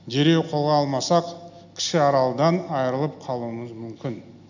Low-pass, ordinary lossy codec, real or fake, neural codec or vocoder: 7.2 kHz; none; real; none